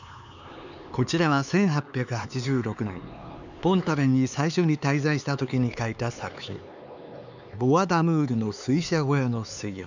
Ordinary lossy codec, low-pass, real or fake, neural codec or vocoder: none; 7.2 kHz; fake; codec, 16 kHz, 4 kbps, X-Codec, HuBERT features, trained on LibriSpeech